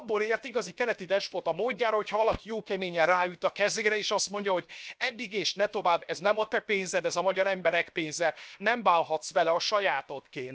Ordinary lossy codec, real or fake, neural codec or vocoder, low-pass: none; fake; codec, 16 kHz, 0.7 kbps, FocalCodec; none